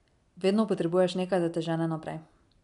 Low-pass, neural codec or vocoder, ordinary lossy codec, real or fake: 10.8 kHz; none; none; real